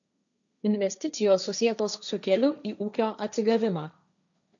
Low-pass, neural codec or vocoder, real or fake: 7.2 kHz; codec, 16 kHz, 1.1 kbps, Voila-Tokenizer; fake